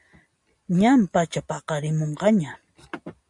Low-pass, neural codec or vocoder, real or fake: 10.8 kHz; none; real